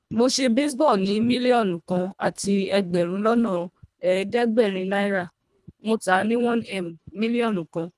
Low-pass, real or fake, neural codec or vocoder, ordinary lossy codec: none; fake; codec, 24 kHz, 1.5 kbps, HILCodec; none